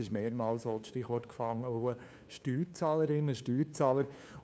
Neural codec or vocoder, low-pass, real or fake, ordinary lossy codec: codec, 16 kHz, 2 kbps, FunCodec, trained on LibriTTS, 25 frames a second; none; fake; none